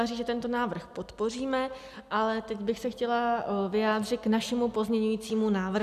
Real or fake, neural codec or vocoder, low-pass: real; none; 14.4 kHz